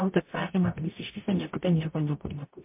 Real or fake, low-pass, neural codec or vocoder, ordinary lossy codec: fake; 3.6 kHz; codec, 44.1 kHz, 0.9 kbps, DAC; MP3, 24 kbps